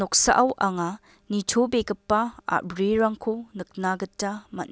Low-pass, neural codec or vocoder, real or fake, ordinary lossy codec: none; none; real; none